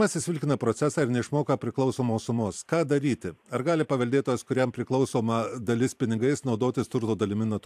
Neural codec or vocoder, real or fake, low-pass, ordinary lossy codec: vocoder, 44.1 kHz, 128 mel bands every 512 samples, BigVGAN v2; fake; 14.4 kHz; AAC, 96 kbps